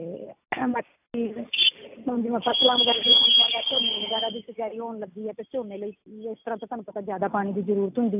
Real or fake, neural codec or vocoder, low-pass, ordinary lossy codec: real; none; 3.6 kHz; AAC, 32 kbps